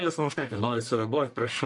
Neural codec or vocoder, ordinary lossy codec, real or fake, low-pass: codec, 44.1 kHz, 1.7 kbps, Pupu-Codec; MP3, 64 kbps; fake; 10.8 kHz